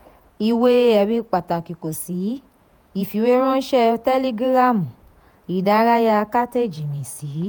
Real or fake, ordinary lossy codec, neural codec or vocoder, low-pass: fake; none; vocoder, 48 kHz, 128 mel bands, Vocos; none